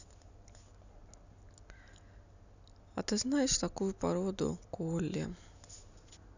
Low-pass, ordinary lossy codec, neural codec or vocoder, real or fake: 7.2 kHz; none; none; real